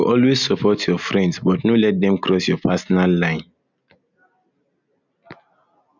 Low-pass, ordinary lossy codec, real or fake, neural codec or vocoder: 7.2 kHz; none; real; none